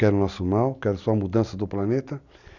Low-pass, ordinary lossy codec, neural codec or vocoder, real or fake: 7.2 kHz; none; none; real